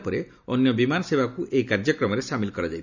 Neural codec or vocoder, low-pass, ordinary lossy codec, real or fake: none; 7.2 kHz; none; real